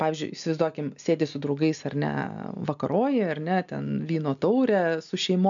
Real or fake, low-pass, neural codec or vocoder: real; 7.2 kHz; none